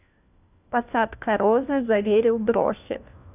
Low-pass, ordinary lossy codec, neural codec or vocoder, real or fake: 3.6 kHz; none; codec, 16 kHz, 1 kbps, FunCodec, trained on LibriTTS, 50 frames a second; fake